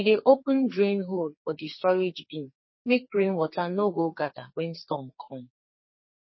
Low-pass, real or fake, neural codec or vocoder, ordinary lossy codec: 7.2 kHz; fake; codec, 44.1 kHz, 2.6 kbps, SNAC; MP3, 24 kbps